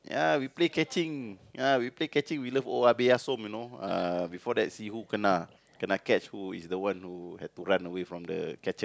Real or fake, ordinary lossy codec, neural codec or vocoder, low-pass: real; none; none; none